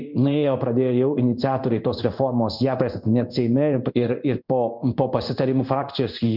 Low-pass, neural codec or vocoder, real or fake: 5.4 kHz; codec, 16 kHz in and 24 kHz out, 1 kbps, XY-Tokenizer; fake